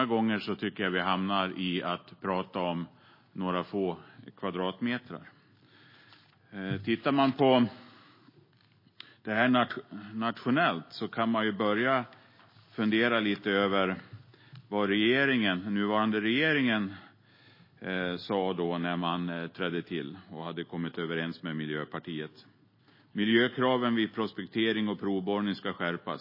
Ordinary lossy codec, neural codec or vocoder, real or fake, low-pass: MP3, 24 kbps; none; real; 5.4 kHz